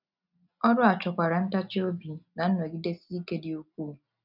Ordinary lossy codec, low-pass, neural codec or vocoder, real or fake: none; 5.4 kHz; none; real